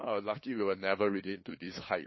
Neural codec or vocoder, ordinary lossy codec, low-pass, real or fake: codec, 16 kHz, 2 kbps, FunCodec, trained on LibriTTS, 25 frames a second; MP3, 24 kbps; 7.2 kHz; fake